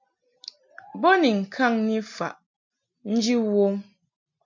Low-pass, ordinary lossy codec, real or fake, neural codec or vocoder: 7.2 kHz; MP3, 64 kbps; real; none